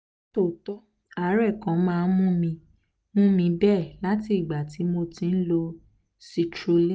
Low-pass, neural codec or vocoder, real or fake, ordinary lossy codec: none; none; real; none